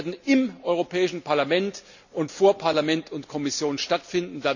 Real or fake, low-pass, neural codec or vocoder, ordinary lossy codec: real; 7.2 kHz; none; none